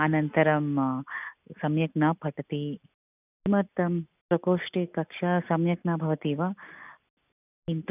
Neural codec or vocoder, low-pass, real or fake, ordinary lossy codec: none; 3.6 kHz; real; none